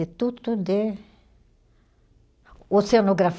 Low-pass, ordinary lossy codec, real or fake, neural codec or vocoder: none; none; real; none